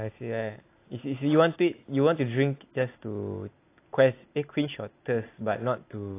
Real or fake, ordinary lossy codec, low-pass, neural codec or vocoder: real; AAC, 24 kbps; 3.6 kHz; none